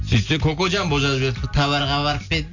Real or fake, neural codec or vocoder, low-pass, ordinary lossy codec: real; none; 7.2 kHz; none